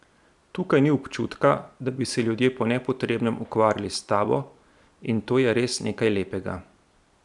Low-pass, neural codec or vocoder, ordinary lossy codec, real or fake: 10.8 kHz; vocoder, 44.1 kHz, 128 mel bands every 256 samples, BigVGAN v2; none; fake